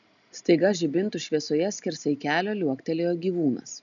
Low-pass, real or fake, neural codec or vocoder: 7.2 kHz; real; none